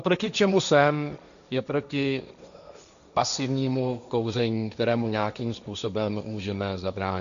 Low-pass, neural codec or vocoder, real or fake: 7.2 kHz; codec, 16 kHz, 1.1 kbps, Voila-Tokenizer; fake